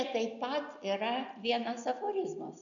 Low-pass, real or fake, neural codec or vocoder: 7.2 kHz; real; none